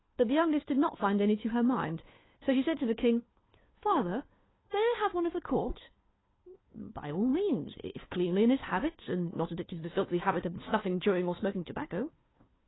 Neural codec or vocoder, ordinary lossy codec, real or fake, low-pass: codec, 16 kHz, 2 kbps, FunCodec, trained on Chinese and English, 25 frames a second; AAC, 16 kbps; fake; 7.2 kHz